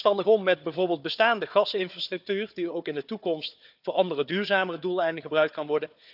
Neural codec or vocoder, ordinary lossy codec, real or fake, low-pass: codec, 16 kHz, 4 kbps, FunCodec, trained on Chinese and English, 50 frames a second; none; fake; 5.4 kHz